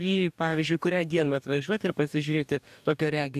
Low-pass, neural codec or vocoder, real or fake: 14.4 kHz; codec, 44.1 kHz, 2.6 kbps, DAC; fake